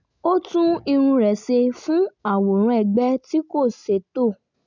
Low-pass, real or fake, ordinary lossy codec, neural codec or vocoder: 7.2 kHz; real; none; none